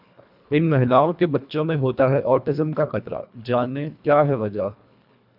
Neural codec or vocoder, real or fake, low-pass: codec, 24 kHz, 1.5 kbps, HILCodec; fake; 5.4 kHz